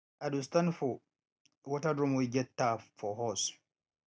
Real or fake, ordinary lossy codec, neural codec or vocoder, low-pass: real; none; none; none